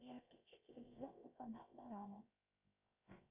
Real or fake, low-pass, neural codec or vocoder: fake; 3.6 kHz; codec, 16 kHz, 0.7 kbps, FocalCodec